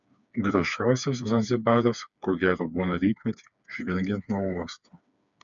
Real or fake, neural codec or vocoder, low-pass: fake; codec, 16 kHz, 4 kbps, FreqCodec, smaller model; 7.2 kHz